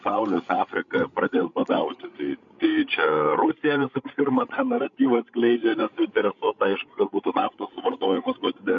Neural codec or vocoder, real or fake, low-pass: codec, 16 kHz, 16 kbps, FreqCodec, larger model; fake; 7.2 kHz